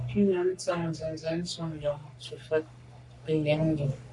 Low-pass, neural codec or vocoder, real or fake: 10.8 kHz; codec, 44.1 kHz, 3.4 kbps, Pupu-Codec; fake